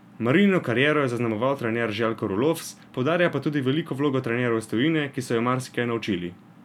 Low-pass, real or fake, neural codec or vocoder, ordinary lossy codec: 19.8 kHz; real; none; none